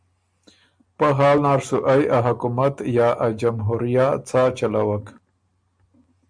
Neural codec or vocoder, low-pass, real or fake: none; 9.9 kHz; real